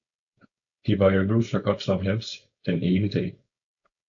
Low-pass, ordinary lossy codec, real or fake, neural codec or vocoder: 7.2 kHz; AAC, 64 kbps; fake; codec, 16 kHz, 4.8 kbps, FACodec